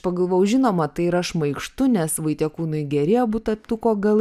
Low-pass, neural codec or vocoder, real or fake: 14.4 kHz; none; real